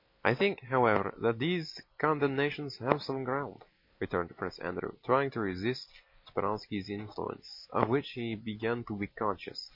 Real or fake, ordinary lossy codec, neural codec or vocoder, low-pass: real; MP3, 32 kbps; none; 5.4 kHz